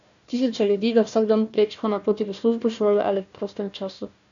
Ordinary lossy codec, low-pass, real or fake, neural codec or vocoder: none; 7.2 kHz; fake; codec, 16 kHz, 1 kbps, FunCodec, trained on Chinese and English, 50 frames a second